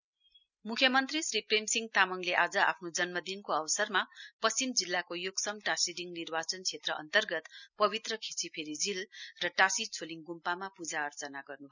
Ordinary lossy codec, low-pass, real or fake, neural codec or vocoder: none; 7.2 kHz; real; none